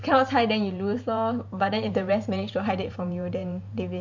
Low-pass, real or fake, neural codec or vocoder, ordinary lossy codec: 7.2 kHz; fake; vocoder, 44.1 kHz, 128 mel bands every 512 samples, BigVGAN v2; MP3, 48 kbps